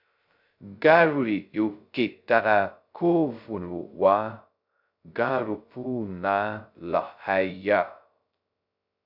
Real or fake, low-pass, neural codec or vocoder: fake; 5.4 kHz; codec, 16 kHz, 0.2 kbps, FocalCodec